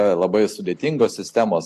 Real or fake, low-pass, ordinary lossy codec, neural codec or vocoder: real; 14.4 kHz; AAC, 64 kbps; none